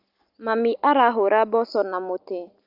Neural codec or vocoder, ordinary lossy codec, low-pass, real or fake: none; Opus, 24 kbps; 5.4 kHz; real